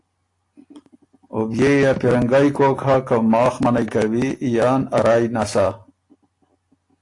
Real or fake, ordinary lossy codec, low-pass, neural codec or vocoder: real; AAC, 48 kbps; 10.8 kHz; none